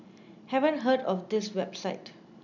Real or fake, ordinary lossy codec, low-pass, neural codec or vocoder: real; none; 7.2 kHz; none